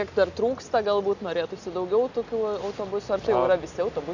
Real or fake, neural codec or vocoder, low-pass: real; none; 7.2 kHz